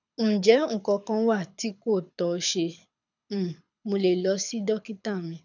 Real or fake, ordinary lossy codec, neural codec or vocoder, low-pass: fake; none; codec, 24 kHz, 6 kbps, HILCodec; 7.2 kHz